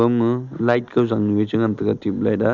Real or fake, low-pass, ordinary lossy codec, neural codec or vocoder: real; 7.2 kHz; none; none